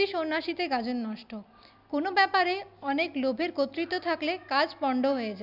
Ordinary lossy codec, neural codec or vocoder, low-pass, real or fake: none; none; 5.4 kHz; real